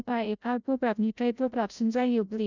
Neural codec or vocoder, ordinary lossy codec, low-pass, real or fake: codec, 16 kHz, 0.5 kbps, FreqCodec, larger model; none; 7.2 kHz; fake